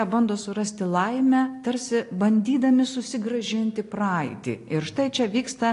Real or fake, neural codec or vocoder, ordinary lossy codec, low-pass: real; none; AAC, 48 kbps; 10.8 kHz